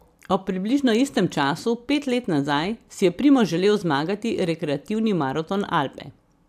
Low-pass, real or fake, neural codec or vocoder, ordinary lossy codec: 14.4 kHz; fake; vocoder, 44.1 kHz, 128 mel bands every 256 samples, BigVGAN v2; AAC, 96 kbps